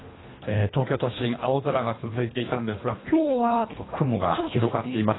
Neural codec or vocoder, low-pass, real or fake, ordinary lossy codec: codec, 24 kHz, 1.5 kbps, HILCodec; 7.2 kHz; fake; AAC, 16 kbps